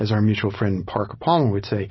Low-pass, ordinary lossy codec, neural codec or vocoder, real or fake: 7.2 kHz; MP3, 24 kbps; none; real